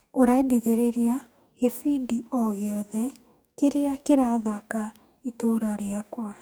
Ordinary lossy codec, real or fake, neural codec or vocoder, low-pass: none; fake; codec, 44.1 kHz, 2.6 kbps, DAC; none